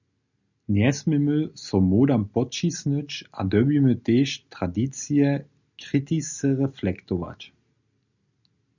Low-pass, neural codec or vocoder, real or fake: 7.2 kHz; none; real